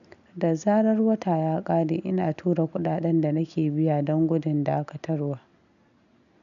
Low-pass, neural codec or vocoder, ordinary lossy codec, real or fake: 7.2 kHz; none; none; real